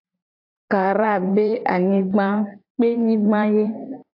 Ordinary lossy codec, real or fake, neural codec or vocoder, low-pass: AAC, 32 kbps; fake; vocoder, 44.1 kHz, 128 mel bands every 512 samples, BigVGAN v2; 5.4 kHz